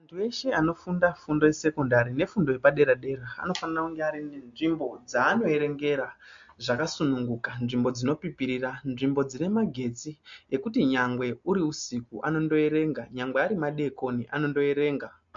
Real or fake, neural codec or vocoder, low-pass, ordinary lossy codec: real; none; 7.2 kHz; MP3, 48 kbps